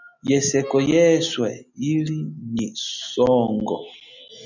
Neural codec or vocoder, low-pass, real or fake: none; 7.2 kHz; real